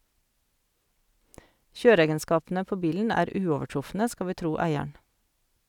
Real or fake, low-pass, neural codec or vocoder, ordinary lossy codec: real; 19.8 kHz; none; none